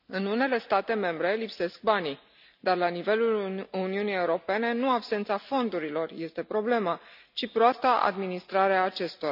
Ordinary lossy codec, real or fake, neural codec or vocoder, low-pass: none; real; none; 5.4 kHz